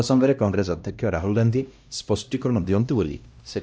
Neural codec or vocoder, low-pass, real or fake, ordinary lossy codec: codec, 16 kHz, 1 kbps, X-Codec, HuBERT features, trained on LibriSpeech; none; fake; none